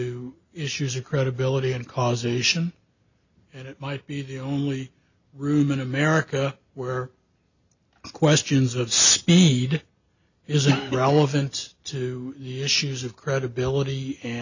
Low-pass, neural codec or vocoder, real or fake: 7.2 kHz; none; real